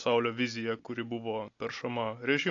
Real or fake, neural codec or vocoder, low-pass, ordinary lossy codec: real; none; 7.2 kHz; AAC, 48 kbps